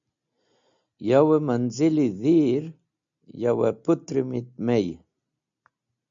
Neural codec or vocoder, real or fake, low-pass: none; real; 7.2 kHz